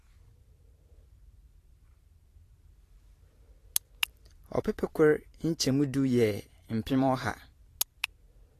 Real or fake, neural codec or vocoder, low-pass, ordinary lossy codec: fake; vocoder, 44.1 kHz, 128 mel bands every 512 samples, BigVGAN v2; 14.4 kHz; AAC, 48 kbps